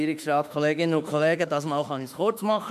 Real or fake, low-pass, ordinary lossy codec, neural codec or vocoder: fake; 14.4 kHz; none; autoencoder, 48 kHz, 32 numbers a frame, DAC-VAE, trained on Japanese speech